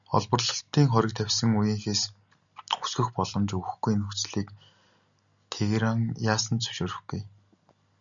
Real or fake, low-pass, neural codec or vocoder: real; 7.2 kHz; none